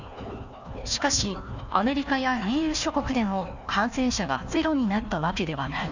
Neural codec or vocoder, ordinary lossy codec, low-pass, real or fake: codec, 16 kHz, 1 kbps, FunCodec, trained on Chinese and English, 50 frames a second; none; 7.2 kHz; fake